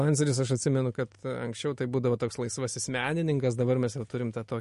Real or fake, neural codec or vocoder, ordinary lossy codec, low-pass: real; none; MP3, 48 kbps; 14.4 kHz